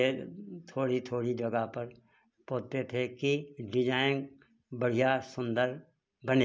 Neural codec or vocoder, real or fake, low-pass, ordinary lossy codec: none; real; none; none